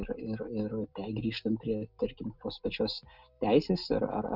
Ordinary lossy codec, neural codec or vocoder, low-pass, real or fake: Opus, 64 kbps; none; 5.4 kHz; real